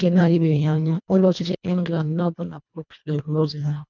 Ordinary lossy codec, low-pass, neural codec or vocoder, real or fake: none; 7.2 kHz; codec, 24 kHz, 1.5 kbps, HILCodec; fake